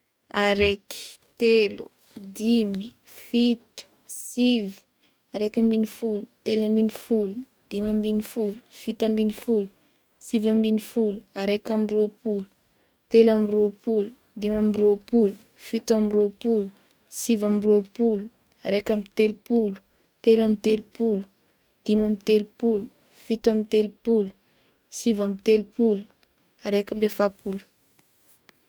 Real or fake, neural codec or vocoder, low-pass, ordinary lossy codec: fake; codec, 44.1 kHz, 2.6 kbps, DAC; none; none